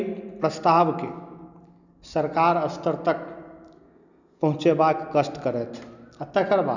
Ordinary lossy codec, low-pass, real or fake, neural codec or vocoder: none; 7.2 kHz; real; none